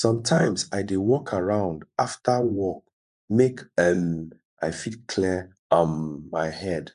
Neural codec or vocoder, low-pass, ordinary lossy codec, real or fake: vocoder, 24 kHz, 100 mel bands, Vocos; 10.8 kHz; none; fake